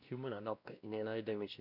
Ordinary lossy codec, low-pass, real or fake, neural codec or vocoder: none; 5.4 kHz; fake; codec, 16 kHz, 1 kbps, X-Codec, WavLM features, trained on Multilingual LibriSpeech